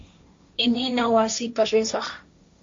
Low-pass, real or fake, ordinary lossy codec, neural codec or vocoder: 7.2 kHz; fake; MP3, 48 kbps; codec, 16 kHz, 1.1 kbps, Voila-Tokenizer